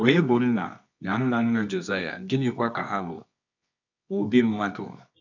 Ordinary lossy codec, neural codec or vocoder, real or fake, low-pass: none; codec, 24 kHz, 0.9 kbps, WavTokenizer, medium music audio release; fake; 7.2 kHz